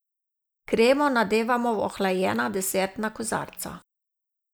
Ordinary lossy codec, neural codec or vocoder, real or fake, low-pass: none; none; real; none